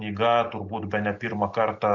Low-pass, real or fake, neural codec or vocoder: 7.2 kHz; real; none